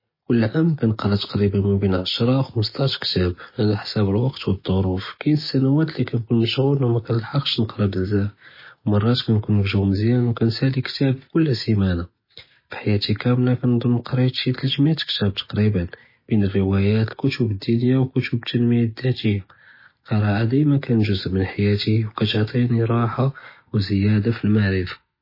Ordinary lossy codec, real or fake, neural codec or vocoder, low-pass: MP3, 24 kbps; real; none; 5.4 kHz